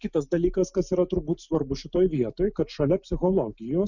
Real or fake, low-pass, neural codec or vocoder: real; 7.2 kHz; none